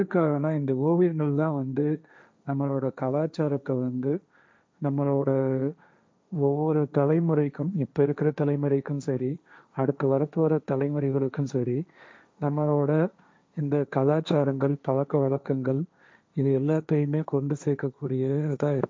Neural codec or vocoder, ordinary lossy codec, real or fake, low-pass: codec, 16 kHz, 1.1 kbps, Voila-Tokenizer; none; fake; none